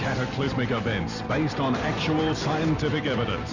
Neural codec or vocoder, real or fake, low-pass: none; real; 7.2 kHz